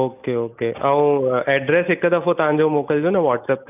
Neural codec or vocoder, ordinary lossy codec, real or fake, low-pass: none; none; real; 3.6 kHz